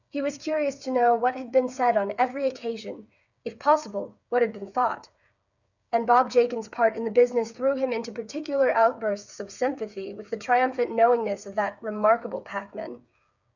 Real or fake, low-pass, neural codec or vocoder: fake; 7.2 kHz; codec, 16 kHz, 8 kbps, FreqCodec, smaller model